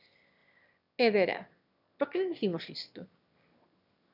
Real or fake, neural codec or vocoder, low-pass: fake; autoencoder, 22.05 kHz, a latent of 192 numbers a frame, VITS, trained on one speaker; 5.4 kHz